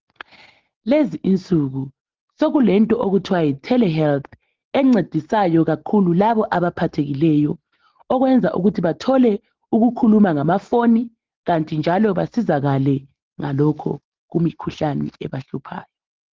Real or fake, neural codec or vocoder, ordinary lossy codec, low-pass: real; none; Opus, 16 kbps; 7.2 kHz